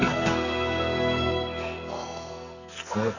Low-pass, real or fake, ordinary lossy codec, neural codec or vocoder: 7.2 kHz; fake; none; codec, 44.1 kHz, 2.6 kbps, SNAC